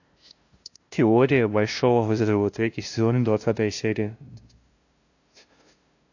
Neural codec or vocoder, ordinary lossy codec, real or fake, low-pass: codec, 16 kHz, 0.5 kbps, FunCodec, trained on LibriTTS, 25 frames a second; AAC, 48 kbps; fake; 7.2 kHz